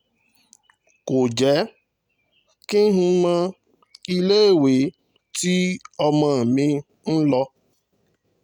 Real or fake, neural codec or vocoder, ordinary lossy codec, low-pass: real; none; none; 19.8 kHz